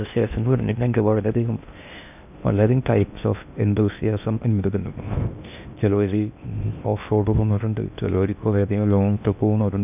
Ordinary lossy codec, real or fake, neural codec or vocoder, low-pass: none; fake; codec, 16 kHz in and 24 kHz out, 0.6 kbps, FocalCodec, streaming, 4096 codes; 3.6 kHz